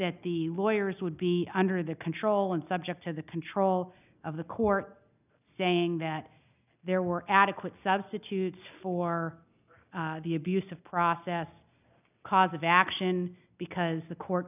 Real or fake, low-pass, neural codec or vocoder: real; 3.6 kHz; none